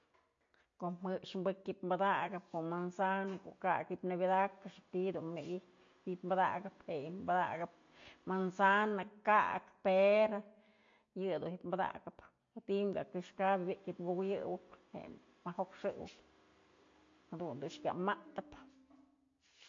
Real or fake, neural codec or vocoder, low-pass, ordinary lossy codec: real; none; 7.2 kHz; AAC, 48 kbps